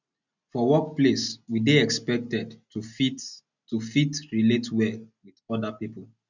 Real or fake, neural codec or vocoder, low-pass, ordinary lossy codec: real; none; 7.2 kHz; none